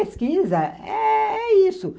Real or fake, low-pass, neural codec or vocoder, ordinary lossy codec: real; none; none; none